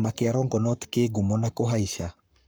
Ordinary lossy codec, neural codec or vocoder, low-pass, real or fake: none; codec, 44.1 kHz, 7.8 kbps, Pupu-Codec; none; fake